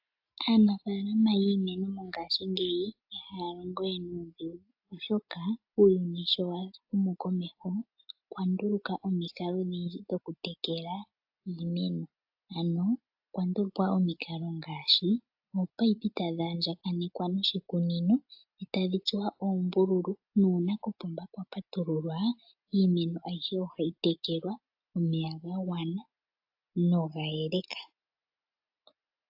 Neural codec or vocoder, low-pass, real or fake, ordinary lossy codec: none; 5.4 kHz; real; AAC, 48 kbps